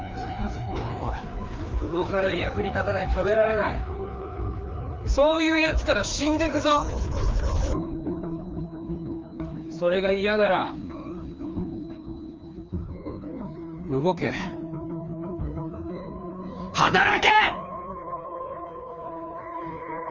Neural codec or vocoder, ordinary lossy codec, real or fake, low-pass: codec, 16 kHz, 2 kbps, FreqCodec, larger model; Opus, 32 kbps; fake; 7.2 kHz